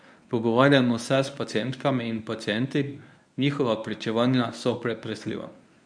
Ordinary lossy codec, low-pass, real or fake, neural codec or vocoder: MP3, 96 kbps; 9.9 kHz; fake; codec, 24 kHz, 0.9 kbps, WavTokenizer, medium speech release version 1